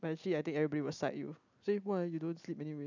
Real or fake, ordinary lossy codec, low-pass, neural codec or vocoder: real; none; 7.2 kHz; none